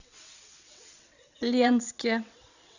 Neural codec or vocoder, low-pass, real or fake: vocoder, 22.05 kHz, 80 mel bands, WaveNeXt; 7.2 kHz; fake